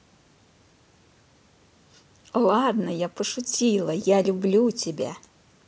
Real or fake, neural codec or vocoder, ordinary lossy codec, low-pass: real; none; none; none